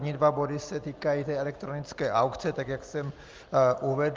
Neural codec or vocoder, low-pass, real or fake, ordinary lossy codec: none; 7.2 kHz; real; Opus, 32 kbps